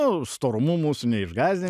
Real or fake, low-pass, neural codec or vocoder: real; 14.4 kHz; none